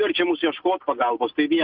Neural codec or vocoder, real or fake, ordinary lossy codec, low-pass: none; real; Opus, 64 kbps; 5.4 kHz